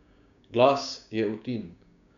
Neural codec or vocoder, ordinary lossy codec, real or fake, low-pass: codec, 16 kHz, 6 kbps, DAC; none; fake; 7.2 kHz